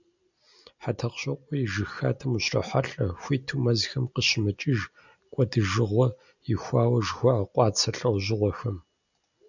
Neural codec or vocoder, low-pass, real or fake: none; 7.2 kHz; real